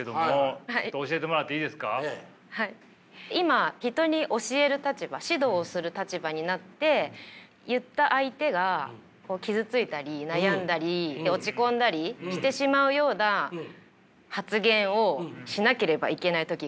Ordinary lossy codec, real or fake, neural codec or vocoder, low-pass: none; real; none; none